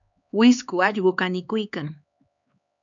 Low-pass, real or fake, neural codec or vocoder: 7.2 kHz; fake; codec, 16 kHz, 4 kbps, X-Codec, HuBERT features, trained on LibriSpeech